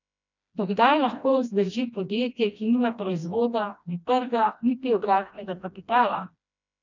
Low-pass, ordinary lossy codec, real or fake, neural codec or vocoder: 7.2 kHz; none; fake; codec, 16 kHz, 1 kbps, FreqCodec, smaller model